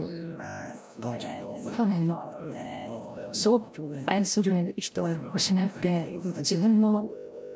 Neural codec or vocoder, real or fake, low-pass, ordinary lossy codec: codec, 16 kHz, 0.5 kbps, FreqCodec, larger model; fake; none; none